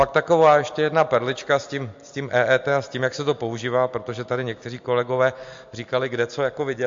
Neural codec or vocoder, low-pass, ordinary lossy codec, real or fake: none; 7.2 kHz; MP3, 48 kbps; real